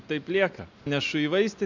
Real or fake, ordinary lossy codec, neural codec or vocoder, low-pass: real; MP3, 64 kbps; none; 7.2 kHz